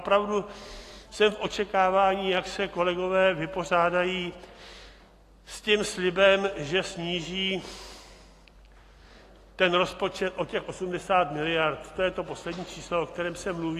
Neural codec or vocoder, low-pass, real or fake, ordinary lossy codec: none; 14.4 kHz; real; AAC, 48 kbps